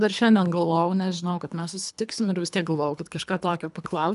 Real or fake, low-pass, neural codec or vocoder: fake; 10.8 kHz; codec, 24 kHz, 3 kbps, HILCodec